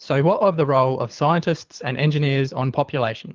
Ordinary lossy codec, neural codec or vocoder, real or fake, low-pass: Opus, 32 kbps; codec, 24 kHz, 6 kbps, HILCodec; fake; 7.2 kHz